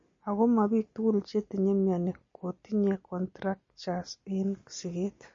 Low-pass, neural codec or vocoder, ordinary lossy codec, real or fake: 7.2 kHz; none; MP3, 32 kbps; real